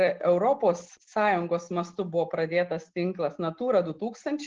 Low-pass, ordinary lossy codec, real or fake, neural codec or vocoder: 7.2 kHz; Opus, 16 kbps; real; none